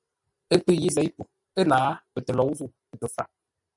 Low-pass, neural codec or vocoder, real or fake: 10.8 kHz; vocoder, 44.1 kHz, 128 mel bands every 512 samples, BigVGAN v2; fake